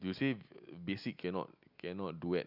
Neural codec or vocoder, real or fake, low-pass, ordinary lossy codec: none; real; 5.4 kHz; none